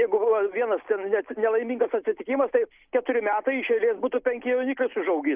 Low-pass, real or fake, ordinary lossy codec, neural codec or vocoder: 3.6 kHz; real; Opus, 24 kbps; none